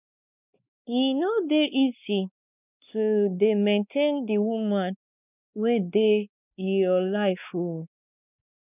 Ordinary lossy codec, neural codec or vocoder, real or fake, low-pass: none; codec, 16 kHz, 2 kbps, X-Codec, WavLM features, trained on Multilingual LibriSpeech; fake; 3.6 kHz